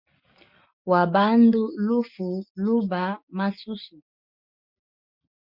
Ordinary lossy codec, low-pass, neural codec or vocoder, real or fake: MP3, 48 kbps; 5.4 kHz; none; real